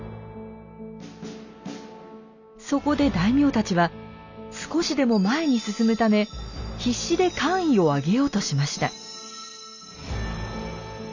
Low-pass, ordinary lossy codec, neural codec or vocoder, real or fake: 7.2 kHz; none; none; real